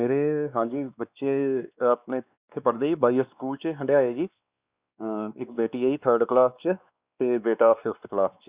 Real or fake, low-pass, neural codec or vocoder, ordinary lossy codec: fake; 3.6 kHz; codec, 16 kHz, 2 kbps, X-Codec, WavLM features, trained on Multilingual LibriSpeech; Opus, 64 kbps